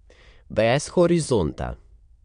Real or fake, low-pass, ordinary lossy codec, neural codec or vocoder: fake; 9.9 kHz; MP3, 64 kbps; autoencoder, 22.05 kHz, a latent of 192 numbers a frame, VITS, trained on many speakers